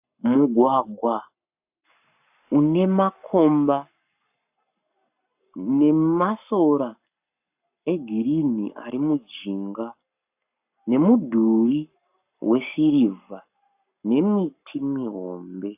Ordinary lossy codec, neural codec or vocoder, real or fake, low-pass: AAC, 32 kbps; codec, 44.1 kHz, 7.8 kbps, Pupu-Codec; fake; 3.6 kHz